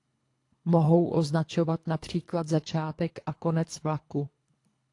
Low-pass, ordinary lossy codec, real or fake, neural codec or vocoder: 10.8 kHz; AAC, 48 kbps; fake; codec, 24 kHz, 3 kbps, HILCodec